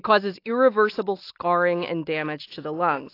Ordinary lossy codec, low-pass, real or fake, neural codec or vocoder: AAC, 32 kbps; 5.4 kHz; real; none